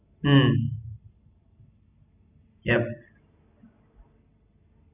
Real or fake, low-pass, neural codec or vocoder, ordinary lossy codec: real; 3.6 kHz; none; none